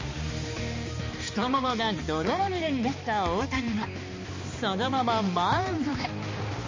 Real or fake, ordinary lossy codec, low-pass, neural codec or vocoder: fake; MP3, 32 kbps; 7.2 kHz; codec, 16 kHz, 2 kbps, X-Codec, HuBERT features, trained on balanced general audio